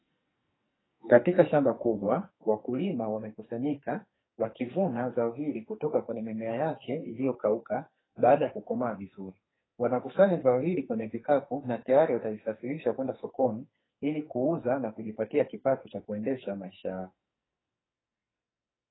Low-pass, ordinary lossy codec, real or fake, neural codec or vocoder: 7.2 kHz; AAC, 16 kbps; fake; codec, 44.1 kHz, 2.6 kbps, SNAC